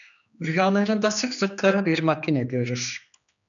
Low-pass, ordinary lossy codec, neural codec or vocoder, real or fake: 7.2 kHz; MP3, 96 kbps; codec, 16 kHz, 2 kbps, X-Codec, HuBERT features, trained on general audio; fake